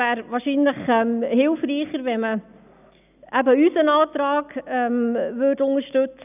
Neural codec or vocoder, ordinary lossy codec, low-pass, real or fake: none; none; 3.6 kHz; real